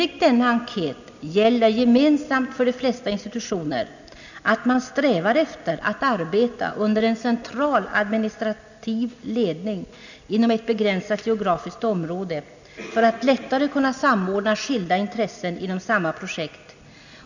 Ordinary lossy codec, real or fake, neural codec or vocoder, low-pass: none; real; none; 7.2 kHz